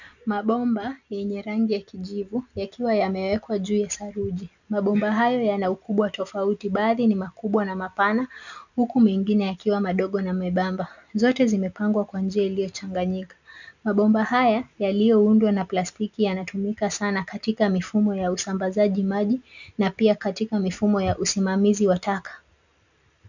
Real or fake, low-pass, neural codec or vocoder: real; 7.2 kHz; none